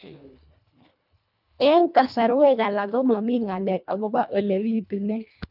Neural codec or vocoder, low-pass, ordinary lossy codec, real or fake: codec, 24 kHz, 1.5 kbps, HILCodec; 5.4 kHz; none; fake